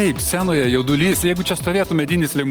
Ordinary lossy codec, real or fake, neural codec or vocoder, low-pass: Opus, 24 kbps; real; none; 19.8 kHz